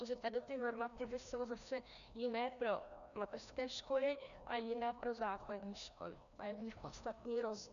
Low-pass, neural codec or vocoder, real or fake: 7.2 kHz; codec, 16 kHz, 1 kbps, FreqCodec, larger model; fake